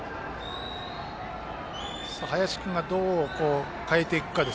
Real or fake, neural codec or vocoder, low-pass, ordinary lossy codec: real; none; none; none